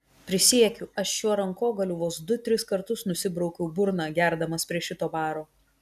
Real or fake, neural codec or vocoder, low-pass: real; none; 14.4 kHz